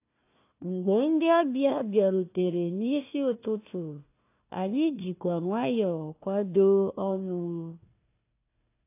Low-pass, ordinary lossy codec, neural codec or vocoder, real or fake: 3.6 kHz; AAC, 24 kbps; codec, 16 kHz, 1 kbps, FunCodec, trained on Chinese and English, 50 frames a second; fake